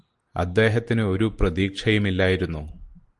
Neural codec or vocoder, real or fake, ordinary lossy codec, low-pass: none; real; Opus, 24 kbps; 10.8 kHz